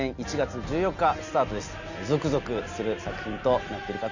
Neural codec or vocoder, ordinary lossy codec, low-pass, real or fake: none; none; 7.2 kHz; real